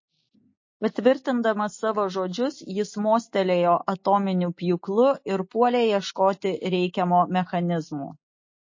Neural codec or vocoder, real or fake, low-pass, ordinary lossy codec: none; real; 7.2 kHz; MP3, 32 kbps